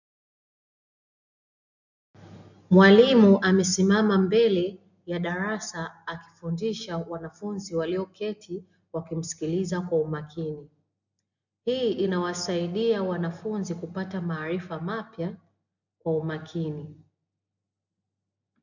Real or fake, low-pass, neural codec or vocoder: real; 7.2 kHz; none